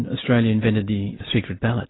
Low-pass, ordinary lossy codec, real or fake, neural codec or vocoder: 7.2 kHz; AAC, 16 kbps; real; none